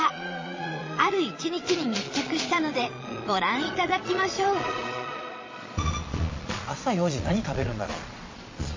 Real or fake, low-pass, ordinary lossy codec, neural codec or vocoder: fake; 7.2 kHz; MP3, 32 kbps; vocoder, 22.05 kHz, 80 mel bands, Vocos